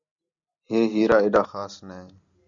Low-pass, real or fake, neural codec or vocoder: 7.2 kHz; real; none